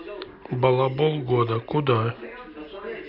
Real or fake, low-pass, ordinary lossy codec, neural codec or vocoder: real; 5.4 kHz; none; none